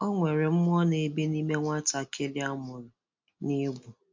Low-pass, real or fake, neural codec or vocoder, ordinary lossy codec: 7.2 kHz; real; none; MP3, 48 kbps